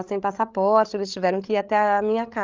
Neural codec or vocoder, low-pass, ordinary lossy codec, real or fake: codec, 16 kHz, 8 kbps, FreqCodec, larger model; 7.2 kHz; Opus, 32 kbps; fake